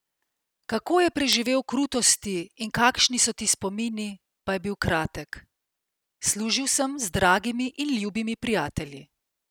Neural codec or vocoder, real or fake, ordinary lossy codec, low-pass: none; real; none; none